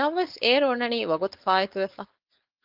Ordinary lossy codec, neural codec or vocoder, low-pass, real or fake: Opus, 32 kbps; codec, 16 kHz, 4.8 kbps, FACodec; 5.4 kHz; fake